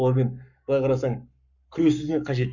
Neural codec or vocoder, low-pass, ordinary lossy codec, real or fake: codec, 44.1 kHz, 7.8 kbps, Pupu-Codec; 7.2 kHz; none; fake